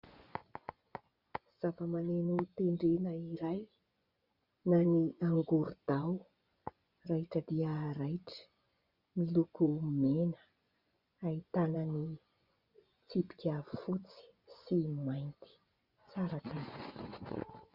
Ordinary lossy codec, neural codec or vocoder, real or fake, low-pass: Opus, 64 kbps; vocoder, 24 kHz, 100 mel bands, Vocos; fake; 5.4 kHz